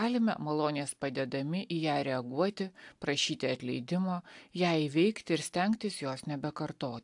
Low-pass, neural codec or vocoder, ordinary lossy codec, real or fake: 10.8 kHz; none; AAC, 64 kbps; real